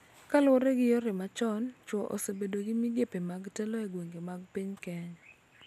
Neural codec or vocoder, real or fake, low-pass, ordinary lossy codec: none; real; 14.4 kHz; none